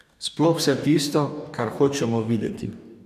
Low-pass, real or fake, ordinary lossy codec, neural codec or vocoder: 14.4 kHz; fake; none; codec, 44.1 kHz, 2.6 kbps, DAC